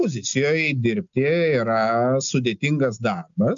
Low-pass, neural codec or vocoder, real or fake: 7.2 kHz; none; real